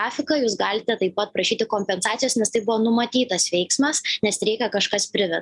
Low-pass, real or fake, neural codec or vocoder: 10.8 kHz; real; none